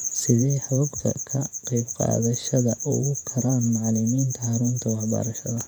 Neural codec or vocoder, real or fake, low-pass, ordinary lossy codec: vocoder, 48 kHz, 128 mel bands, Vocos; fake; 19.8 kHz; none